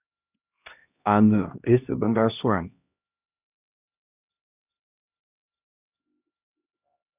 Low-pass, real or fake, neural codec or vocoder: 3.6 kHz; fake; codec, 16 kHz, 1 kbps, X-Codec, HuBERT features, trained on LibriSpeech